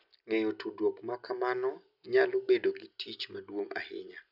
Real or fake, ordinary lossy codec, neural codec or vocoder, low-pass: real; none; none; 5.4 kHz